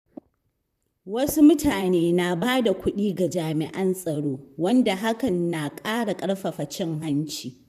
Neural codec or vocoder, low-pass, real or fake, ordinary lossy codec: vocoder, 44.1 kHz, 128 mel bands, Pupu-Vocoder; 14.4 kHz; fake; none